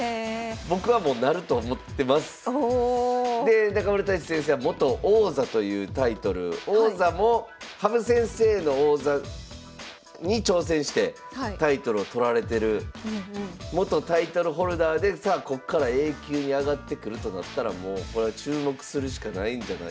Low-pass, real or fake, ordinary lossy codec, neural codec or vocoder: none; real; none; none